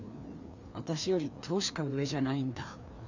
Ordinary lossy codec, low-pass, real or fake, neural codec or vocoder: none; 7.2 kHz; fake; codec, 16 kHz, 2 kbps, FreqCodec, larger model